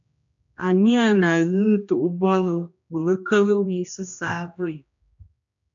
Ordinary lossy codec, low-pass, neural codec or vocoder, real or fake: MP3, 64 kbps; 7.2 kHz; codec, 16 kHz, 1 kbps, X-Codec, HuBERT features, trained on general audio; fake